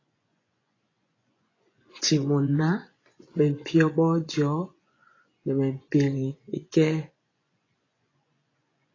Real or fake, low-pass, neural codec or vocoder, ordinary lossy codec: fake; 7.2 kHz; vocoder, 24 kHz, 100 mel bands, Vocos; AAC, 32 kbps